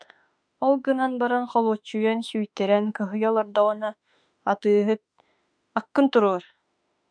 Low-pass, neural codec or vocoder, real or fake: 9.9 kHz; autoencoder, 48 kHz, 32 numbers a frame, DAC-VAE, trained on Japanese speech; fake